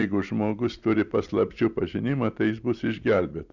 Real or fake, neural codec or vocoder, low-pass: fake; vocoder, 44.1 kHz, 128 mel bands every 256 samples, BigVGAN v2; 7.2 kHz